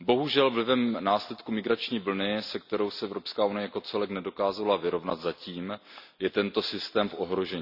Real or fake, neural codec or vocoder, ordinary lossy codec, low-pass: real; none; none; 5.4 kHz